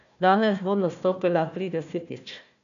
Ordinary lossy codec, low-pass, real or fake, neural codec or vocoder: none; 7.2 kHz; fake; codec, 16 kHz, 1 kbps, FunCodec, trained on Chinese and English, 50 frames a second